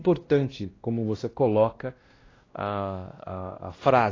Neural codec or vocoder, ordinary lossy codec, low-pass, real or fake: codec, 16 kHz, 1 kbps, X-Codec, WavLM features, trained on Multilingual LibriSpeech; AAC, 32 kbps; 7.2 kHz; fake